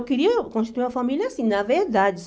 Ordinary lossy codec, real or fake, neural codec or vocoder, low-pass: none; real; none; none